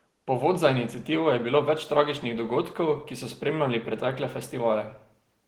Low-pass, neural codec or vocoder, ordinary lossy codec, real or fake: 19.8 kHz; none; Opus, 16 kbps; real